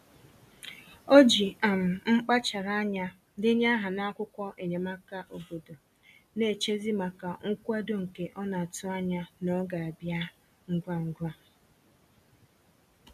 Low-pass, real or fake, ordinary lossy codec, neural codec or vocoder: 14.4 kHz; real; none; none